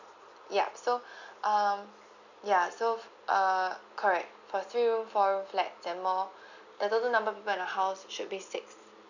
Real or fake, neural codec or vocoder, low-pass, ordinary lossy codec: real; none; 7.2 kHz; none